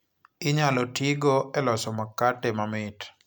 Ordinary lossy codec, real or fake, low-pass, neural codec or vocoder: none; real; none; none